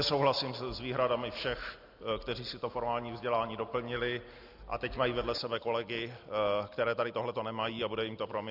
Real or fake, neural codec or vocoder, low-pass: real; none; 5.4 kHz